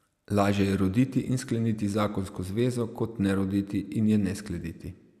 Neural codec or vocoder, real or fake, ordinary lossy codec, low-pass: none; real; none; 14.4 kHz